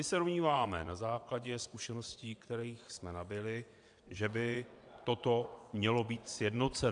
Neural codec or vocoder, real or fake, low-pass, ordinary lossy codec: vocoder, 22.05 kHz, 80 mel bands, WaveNeXt; fake; 9.9 kHz; AAC, 64 kbps